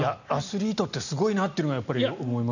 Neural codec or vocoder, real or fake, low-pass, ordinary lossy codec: none; real; 7.2 kHz; none